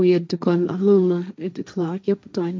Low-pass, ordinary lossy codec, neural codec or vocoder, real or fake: none; none; codec, 16 kHz, 1.1 kbps, Voila-Tokenizer; fake